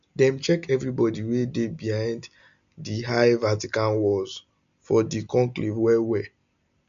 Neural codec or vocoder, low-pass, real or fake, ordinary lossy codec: none; 7.2 kHz; real; none